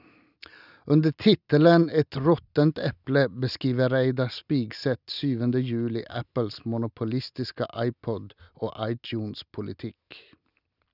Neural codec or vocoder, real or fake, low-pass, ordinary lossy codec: none; real; 5.4 kHz; none